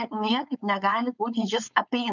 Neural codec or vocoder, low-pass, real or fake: codec, 16 kHz, 4.8 kbps, FACodec; 7.2 kHz; fake